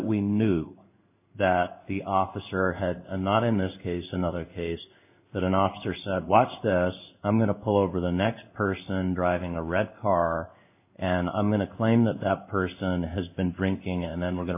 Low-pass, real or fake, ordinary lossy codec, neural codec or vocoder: 3.6 kHz; fake; AAC, 32 kbps; codec, 16 kHz in and 24 kHz out, 1 kbps, XY-Tokenizer